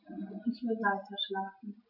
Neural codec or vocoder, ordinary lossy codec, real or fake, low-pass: none; none; real; 5.4 kHz